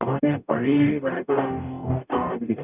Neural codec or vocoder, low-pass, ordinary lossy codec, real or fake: codec, 44.1 kHz, 0.9 kbps, DAC; 3.6 kHz; none; fake